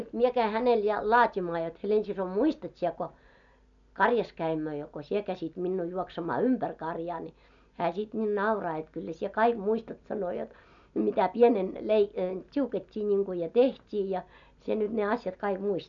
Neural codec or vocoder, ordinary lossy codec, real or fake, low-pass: none; none; real; 7.2 kHz